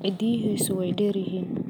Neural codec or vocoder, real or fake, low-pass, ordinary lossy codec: none; real; none; none